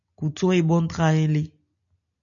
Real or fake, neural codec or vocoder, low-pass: real; none; 7.2 kHz